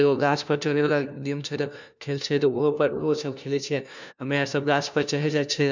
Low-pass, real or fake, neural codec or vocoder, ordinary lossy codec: 7.2 kHz; fake; codec, 16 kHz, 1 kbps, FunCodec, trained on LibriTTS, 50 frames a second; none